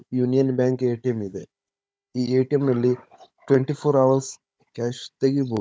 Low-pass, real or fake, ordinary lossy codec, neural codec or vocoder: none; fake; none; codec, 16 kHz, 16 kbps, FunCodec, trained on Chinese and English, 50 frames a second